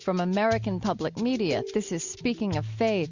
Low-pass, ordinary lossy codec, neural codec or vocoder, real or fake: 7.2 kHz; Opus, 64 kbps; none; real